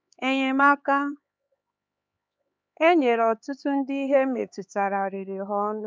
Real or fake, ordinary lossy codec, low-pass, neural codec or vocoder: fake; none; none; codec, 16 kHz, 4 kbps, X-Codec, HuBERT features, trained on LibriSpeech